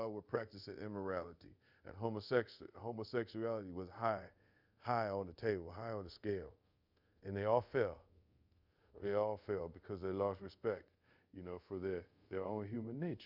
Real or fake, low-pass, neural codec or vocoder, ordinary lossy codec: fake; 5.4 kHz; codec, 24 kHz, 0.5 kbps, DualCodec; Opus, 64 kbps